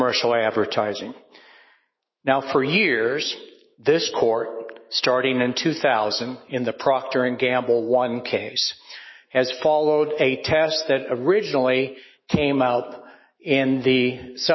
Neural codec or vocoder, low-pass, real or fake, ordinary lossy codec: autoencoder, 48 kHz, 128 numbers a frame, DAC-VAE, trained on Japanese speech; 7.2 kHz; fake; MP3, 24 kbps